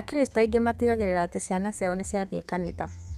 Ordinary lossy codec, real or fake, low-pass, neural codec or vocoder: none; fake; 14.4 kHz; codec, 32 kHz, 1.9 kbps, SNAC